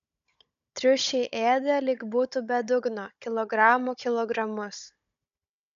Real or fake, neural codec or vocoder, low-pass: fake; codec, 16 kHz, 16 kbps, FunCodec, trained on Chinese and English, 50 frames a second; 7.2 kHz